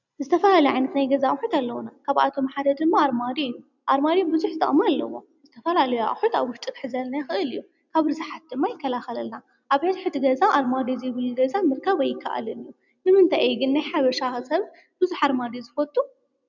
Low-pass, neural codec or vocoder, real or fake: 7.2 kHz; none; real